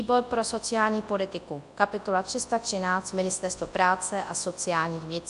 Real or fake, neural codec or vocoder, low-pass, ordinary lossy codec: fake; codec, 24 kHz, 0.9 kbps, WavTokenizer, large speech release; 10.8 kHz; AAC, 64 kbps